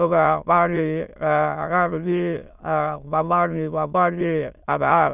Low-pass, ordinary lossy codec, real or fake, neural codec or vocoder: 3.6 kHz; none; fake; autoencoder, 22.05 kHz, a latent of 192 numbers a frame, VITS, trained on many speakers